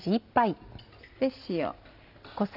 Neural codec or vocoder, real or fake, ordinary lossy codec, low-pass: none; real; none; 5.4 kHz